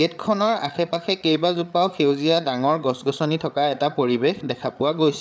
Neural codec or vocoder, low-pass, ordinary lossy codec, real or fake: codec, 16 kHz, 8 kbps, FreqCodec, larger model; none; none; fake